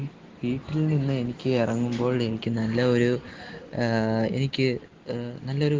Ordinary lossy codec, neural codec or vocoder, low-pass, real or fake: Opus, 16 kbps; none; 7.2 kHz; real